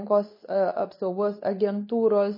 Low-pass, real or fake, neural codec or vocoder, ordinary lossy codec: 5.4 kHz; fake; codec, 24 kHz, 0.9 kbps, WavTokenizer, medium speech release version 2; MP3, 24 kbps